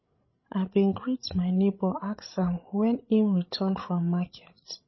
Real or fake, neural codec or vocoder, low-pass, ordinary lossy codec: fake; codec, 16 kHz, 16 kbps, FreqCodec, larger model; 7.2 kHz; MP3, 24 kbps